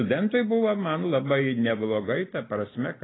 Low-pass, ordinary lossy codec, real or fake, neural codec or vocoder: 7.2 kHz; AAC, 16 kbps; real; none